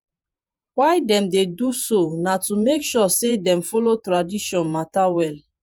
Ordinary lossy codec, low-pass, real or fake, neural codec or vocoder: none; none; fake; vocoder, 48 kHz, 128 mel bands, Vocos